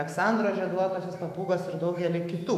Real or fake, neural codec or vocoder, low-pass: fake; autoencoder, 48 kHz, 128 numbers a frame, DAC-VAE, trained on Japanese speech; 14.4 kHz